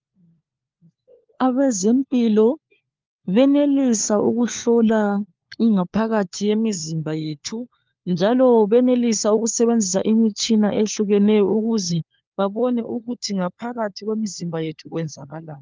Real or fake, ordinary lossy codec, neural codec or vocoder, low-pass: fake; Opus, 32 kbps; codec, 16 kHz, 4 kbps, FunCodec, trained on LibriTTS, 50 frames a second; 7.2 kHz